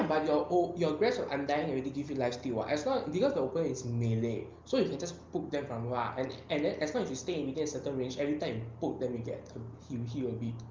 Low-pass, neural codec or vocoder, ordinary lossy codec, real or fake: 7.2 kHz; none; Opus, 24 kbps; real